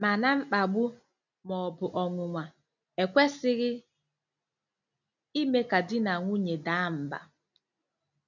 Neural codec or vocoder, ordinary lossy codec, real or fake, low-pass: none; none; real; 7.2 kHz